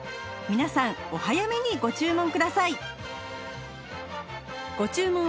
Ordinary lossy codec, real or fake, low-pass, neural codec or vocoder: none; real; none; none